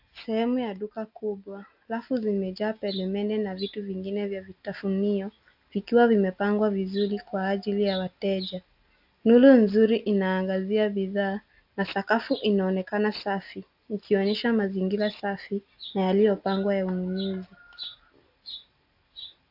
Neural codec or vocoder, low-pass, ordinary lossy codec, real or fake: none; 5.4 kHz; AAC, 48 kbps; real